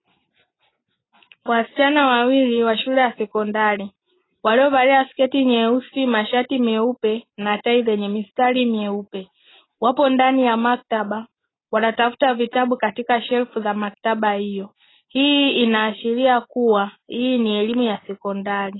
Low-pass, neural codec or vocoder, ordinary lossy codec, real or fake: 7.2 kHz; none; AAC, 16 kbps; real